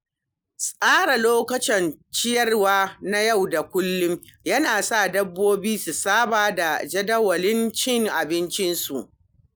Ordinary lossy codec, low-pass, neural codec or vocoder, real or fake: none; none; none; real